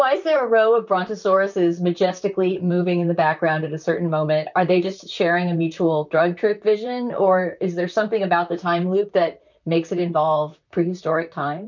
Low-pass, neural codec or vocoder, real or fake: 7.2 kHz; vocoder, 44.1 kHz, 128 mel bands, Pupu-Vocoder; fake